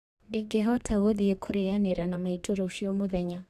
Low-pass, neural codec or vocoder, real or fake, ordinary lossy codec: 14.4 kHz; codec, 32 kHz, 1.9 kbps, SNAC; fake; none